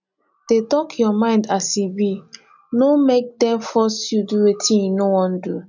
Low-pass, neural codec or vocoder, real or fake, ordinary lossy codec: 7.2 kHz; none; real; none